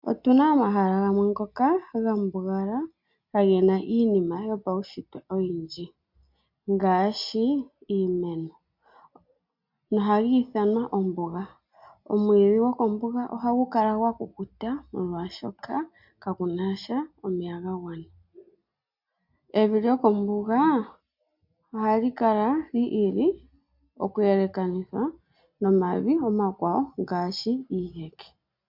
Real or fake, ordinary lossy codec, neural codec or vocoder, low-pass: real; MP3, 48 kbps; none; 5.4 kHz